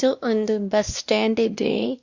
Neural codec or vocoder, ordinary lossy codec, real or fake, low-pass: codec, 16 kHz, 1 kbps, X-Codec, WavLM features, trained on Multilingual LibriSpeech; Opus, 64 kbps; fake; 7.2 kHz